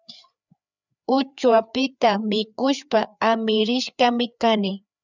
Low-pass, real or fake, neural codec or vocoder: 7.2 kHz; fake; codec, 16 kHz, 4 kbps, FreqCodec, larger model